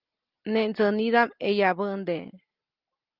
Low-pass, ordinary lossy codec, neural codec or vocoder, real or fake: 5.4 kHz; Opus, 32 kbps; none; real